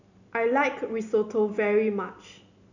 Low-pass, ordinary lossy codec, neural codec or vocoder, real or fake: 7.2 kHz; none; none; real